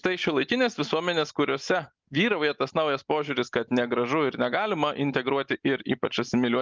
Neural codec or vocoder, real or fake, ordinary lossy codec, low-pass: none; real; Opus, 24 kbps; 7.2 kHz